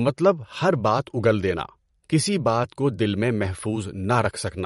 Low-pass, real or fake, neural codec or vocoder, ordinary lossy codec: 19.8 kHz; fake; vocoder, 44.1 kHz, 128 mel bands every 512 samples, BigVGAN v2; MP3, 48 kbps